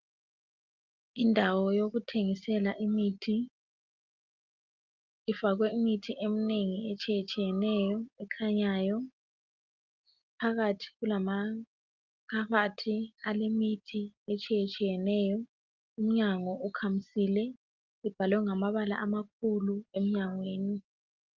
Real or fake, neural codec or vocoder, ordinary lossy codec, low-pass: real; none; Opus, 32 kbps; 7.2 kHz